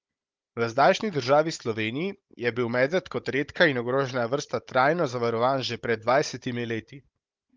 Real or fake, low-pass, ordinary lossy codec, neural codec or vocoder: fake; 7.2 kHz; Opus, 24 kbps; codec, 16 kHz, 16 kbps, FunCodec, trained on Chinese and English, 50 frames a second